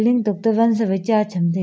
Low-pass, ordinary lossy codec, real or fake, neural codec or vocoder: none; none; real; none